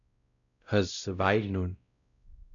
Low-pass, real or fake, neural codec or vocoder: 7.2 kHz; fake; codec, 16 kHz, 0.5 kbps, X-Codec, WavLM features, trained on Multilingual LibriSpeech